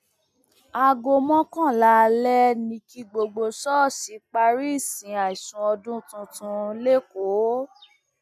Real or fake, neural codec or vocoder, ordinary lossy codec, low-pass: real; none; none; 14.4 kHz